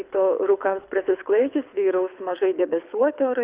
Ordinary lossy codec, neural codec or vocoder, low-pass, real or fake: Opus, 64 kbps; codec, 24 kHz, 6 kbps, HILCodec; 3.6 kHz; fake